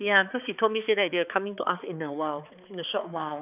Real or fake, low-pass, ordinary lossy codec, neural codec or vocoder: fake; 3.6 kHz; none; codec, 16 kHz, 4 kbps, X-Codec, HuBERT features, trained on balanced general audio